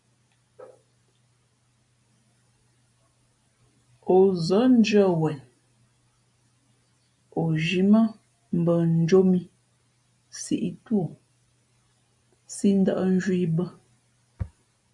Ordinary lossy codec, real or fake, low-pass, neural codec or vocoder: MP3, 96 kbps; real; 10.8 kHz; none